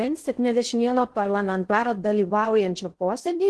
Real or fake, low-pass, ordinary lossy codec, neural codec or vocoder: fake; 10.8 kHz; Opus, 16 kbps; codec, 16 kHz in and 24 kHz out, 0.6 kbps, FocalCodec, streaming, 2048 codes